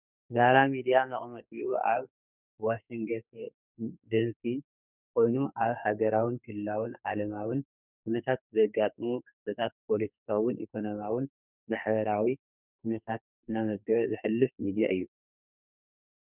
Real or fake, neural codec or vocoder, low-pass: fake; codec, 44.1 kHz, 2.6 kbps, SNAC; 3.6 kHz